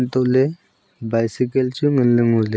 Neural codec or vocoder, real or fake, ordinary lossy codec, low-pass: none; real; none; none